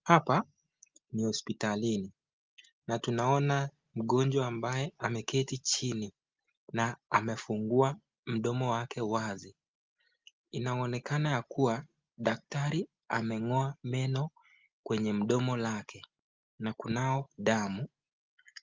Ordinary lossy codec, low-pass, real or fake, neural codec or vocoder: Opus, 32 kbps; 7.2 kHz; real; none